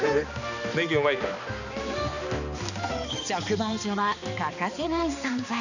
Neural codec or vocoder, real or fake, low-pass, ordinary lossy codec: codec, 16 kHz, 2 kbps, X-Codec, HuBERT features, trained on balanced general audio; fake; 7.2 kHz; none